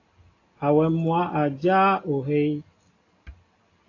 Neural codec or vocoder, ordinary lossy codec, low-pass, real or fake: none; AAC, 32 kbps; 7.2 kHz; real